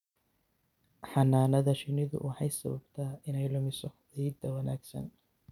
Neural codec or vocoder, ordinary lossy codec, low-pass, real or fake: none; none; 19.8 kHz; real